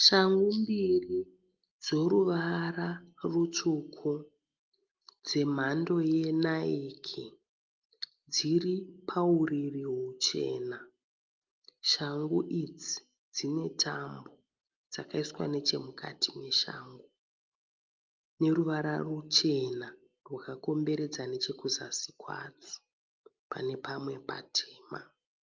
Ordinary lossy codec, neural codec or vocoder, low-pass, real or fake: Opus, 24 kbps; none; 7.2 kHz; real